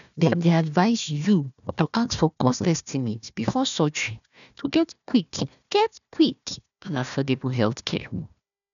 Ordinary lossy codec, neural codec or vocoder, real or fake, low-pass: none; codec, 16 kHz, 1 kbps, FunCodec, trained on Chinese and English, 50 frames a second; fake; 7.2 kHz